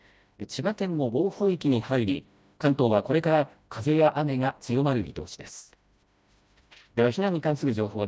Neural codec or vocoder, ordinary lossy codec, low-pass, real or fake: codec, 16 kHz, 1 kbps, FreqCodec, smaller model; none; none; fake